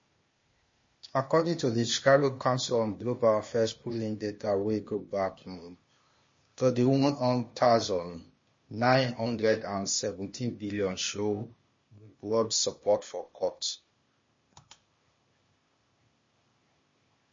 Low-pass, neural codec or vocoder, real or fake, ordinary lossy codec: 7.2 kHz; codec, 16 kHz, 0.8 kbps, ZipCodec; fake; MP3, 32 kbps